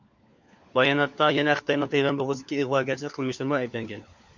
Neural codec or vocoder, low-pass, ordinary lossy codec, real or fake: codec, 16 kHz, 4 kbps, FunCodec, trained on LibriTTS, 50 frames a second; 7.2 kHz; MP3, 48 kbps; fake